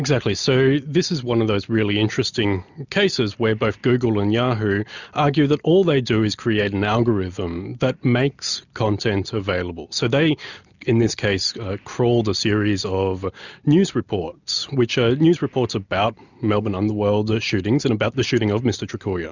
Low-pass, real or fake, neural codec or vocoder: 7.2 kHz; real; none